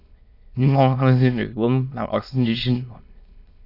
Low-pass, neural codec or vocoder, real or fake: 5.4 kHz; autoencoder, 22.05 kHz, a latent of 192 numbers a frame, VITS, trained on many speakers; fake